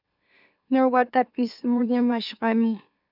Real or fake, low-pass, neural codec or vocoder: fake; 5.4 kHz; autoencoder, 44.1 kHz, a latent of 192 numbers a frame, MeloTTS